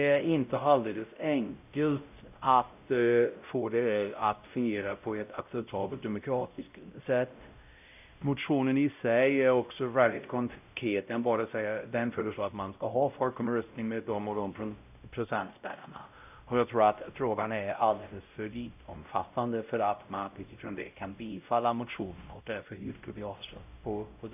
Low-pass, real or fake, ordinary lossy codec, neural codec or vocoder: 3.6 kHz; fake; none; codec, 16 kHz, 0.5 kbps, X-Codec, WavLM features, trained on Multilingual LibriSpeech